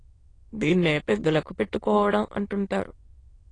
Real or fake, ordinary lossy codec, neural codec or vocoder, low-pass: fake; AAC, 32 kbps; autoencoder, 22.05 kHz, a latent of 192 numbers a frame, VITS, trained on many speakers; 9.9 kHz